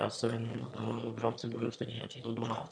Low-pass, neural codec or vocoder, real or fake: 9.9 kHz; autoencoder, 22.05 kHz, a latent of 192 numbers a frame, VITS, trained on one speaker; fake